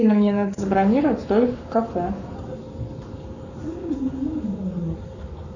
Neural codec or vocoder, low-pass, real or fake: codec, 44.1 kHz, 7.8 kbps, Pupu-Codec; 7.2 kHz; fake